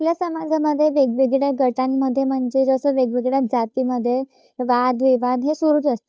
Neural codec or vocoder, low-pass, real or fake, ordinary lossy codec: codec, 16 kHz, 2 kbps, FunCodec, trained on Chinese and English, 25 frames a second; none; fake; none